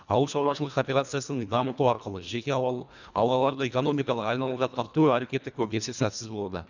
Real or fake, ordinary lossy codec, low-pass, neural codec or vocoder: fake; none; 7.2 kHz; codec, 24 kHz, 1.5 kbps, HILCodec